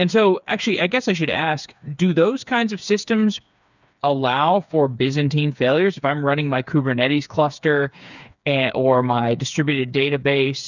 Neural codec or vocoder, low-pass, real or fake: codec, 16 kHz, 4 kbps, FreqCodec, smaller model; 7.2 kHz; fake